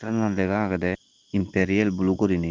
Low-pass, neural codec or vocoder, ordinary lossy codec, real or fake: 7.2 kHz; none; Opus, 16 kbps; real